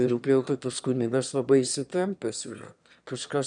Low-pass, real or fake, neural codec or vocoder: 9.9 kHz; fake; autoencoder, 22.05 kHz, a latent of 192 numbers a frame, VITS, trained on one speaker